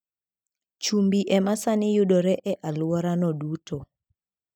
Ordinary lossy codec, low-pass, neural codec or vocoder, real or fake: none; 19.8 kHz; none; real